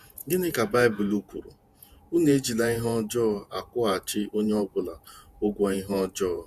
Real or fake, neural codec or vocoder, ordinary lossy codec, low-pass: fake; vocoder, 48 kHz, 128 mel bands, Vocos; Opus, 64 kbps; 14.4 kHz